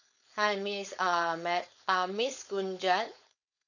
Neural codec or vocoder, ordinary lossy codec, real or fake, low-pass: codec, 16 kHz, 4.8 kbps, FACodec; none; fake; 7.2 kHz